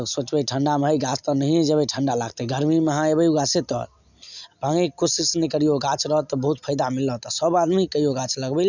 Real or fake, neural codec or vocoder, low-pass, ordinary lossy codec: real; none; 7.2 kHz; none